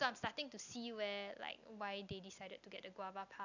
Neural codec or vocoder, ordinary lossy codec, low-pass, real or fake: none; none; 7.2 kHz; real